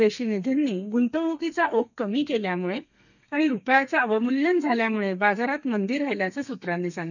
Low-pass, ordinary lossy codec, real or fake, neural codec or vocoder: 7.2 kHz; none; fake; codec, 32 kHz, 1.9 kbps, SNAC